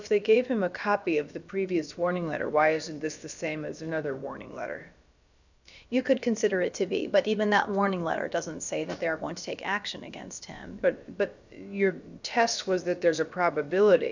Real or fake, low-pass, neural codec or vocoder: fake; 7.2 kHz; codec, 16 kHz, about 1 kbps, DyCAST, with the encoder's durations